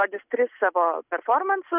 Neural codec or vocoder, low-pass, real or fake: none; 3.6 kHz; real